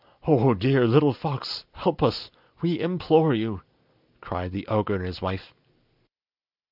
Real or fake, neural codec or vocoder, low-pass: real; none; 5.4 kHz